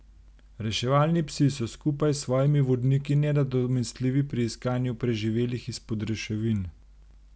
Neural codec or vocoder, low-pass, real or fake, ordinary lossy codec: none; none; real; none